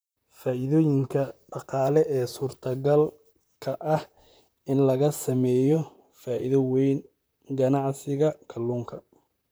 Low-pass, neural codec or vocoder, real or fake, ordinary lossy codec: none; vocoder, 44.1 kHz, 128 mel bands, Pupu-Vocoder; fake; none